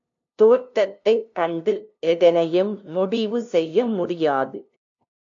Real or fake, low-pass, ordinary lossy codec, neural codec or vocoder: fake; 7.2 kHz; AAC, 64 kbps; codec, 16 kHz, 0.5 kbps, FunCodec, trained on LibriTTS, 25 frames a second